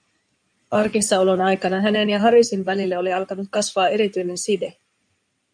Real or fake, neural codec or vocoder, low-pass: fake; codec, 16 kHz in and 24 kHz out, 2.2 kbps, FireRedTTS-2 codec; 9.9 kHz